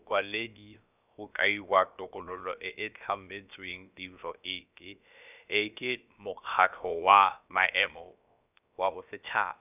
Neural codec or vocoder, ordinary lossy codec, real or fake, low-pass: codec, 16 kHz, about 1 kbps, DyCAST, with the encoder's durations; none; fake; 3.6 kHz